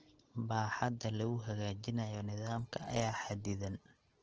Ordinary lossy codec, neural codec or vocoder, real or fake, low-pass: Opus, 16 kbps; none; real; 7.2 kHz